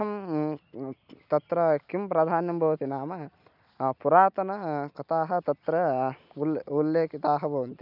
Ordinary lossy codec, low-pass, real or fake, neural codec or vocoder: none; 5.4 kHz; real; none